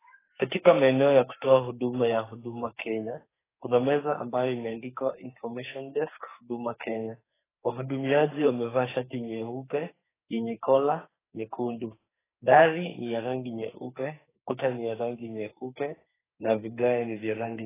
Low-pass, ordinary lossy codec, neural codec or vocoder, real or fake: 3.6 kHz; AAC, 16 kbps; codec, 44.1 kHz, 2.6 kbps, SNAC; fake